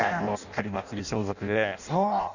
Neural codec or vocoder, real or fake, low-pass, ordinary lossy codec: codec, 16 kHz in and 24 kHz out, 0.6 kbps, FireRedTTS-2 codec; fake; 7.2 kHz; none